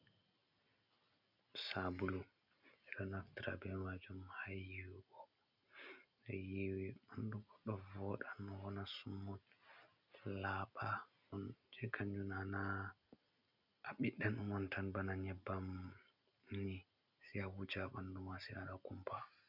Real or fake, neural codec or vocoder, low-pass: real; none; 5.4 kHz